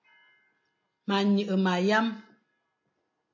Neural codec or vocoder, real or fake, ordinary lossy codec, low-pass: none; real; MP3, 48 kbps; 7.2 kHz